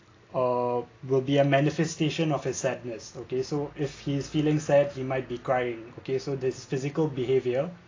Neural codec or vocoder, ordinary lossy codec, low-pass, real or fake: none; AAC, 32 kbps; 7.2 kHz; real